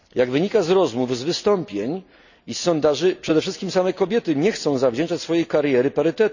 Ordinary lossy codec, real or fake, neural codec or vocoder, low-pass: none; real; none; 7.2 kHz